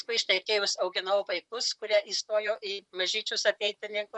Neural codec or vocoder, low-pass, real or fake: vocoder, 44.1 kHz, 128 mel bands, Pupu-Vocoder; 10.8 kHz; fake